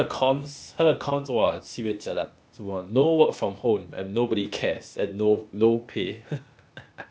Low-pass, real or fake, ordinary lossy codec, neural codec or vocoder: none; fake; none; codec, 16 kHz, 0.8 kbps, ZipCodec